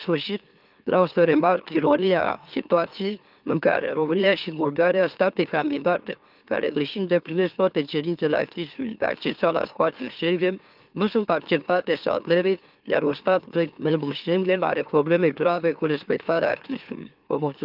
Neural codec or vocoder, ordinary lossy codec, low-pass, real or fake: autoencoder, 44.1 kHz, a latent of 192 numbers a frame, MeloTTS; Opus, 24 kbps; 5.4 kHz; fake